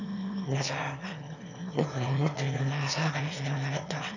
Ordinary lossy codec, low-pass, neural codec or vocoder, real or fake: none; 7.2 kHz; autoencoder, 22.05 kHz, a latent of 192 numbers a frame, VITS, trained on one speaker; fake